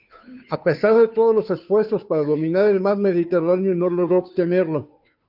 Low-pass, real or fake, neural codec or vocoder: 5.4 kHz; fake; codec, 16 kHz, 2 kbps, FunCodec, trained on Chinese and English, 25 frames a second